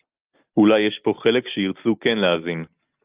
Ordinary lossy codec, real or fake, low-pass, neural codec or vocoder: Opus, 32 kbps; real; 3.6 kHz; none